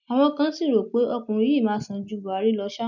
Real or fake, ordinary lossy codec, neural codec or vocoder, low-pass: real; none; none; 7.2 kHz